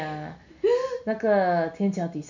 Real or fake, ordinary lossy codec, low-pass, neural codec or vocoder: real; none; 7.2 kHz; none